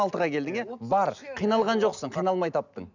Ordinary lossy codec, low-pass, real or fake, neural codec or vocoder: none; 7.2 kHz; real; none